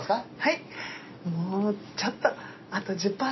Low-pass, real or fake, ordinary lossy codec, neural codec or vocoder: 7.2 kHz; real; MP3, 24 kbps; none